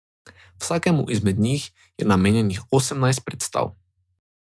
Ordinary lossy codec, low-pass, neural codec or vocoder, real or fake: none; none; none; real